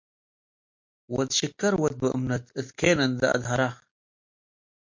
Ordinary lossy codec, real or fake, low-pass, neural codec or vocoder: MP3, 48 kbps; real; 7.2 kHz; none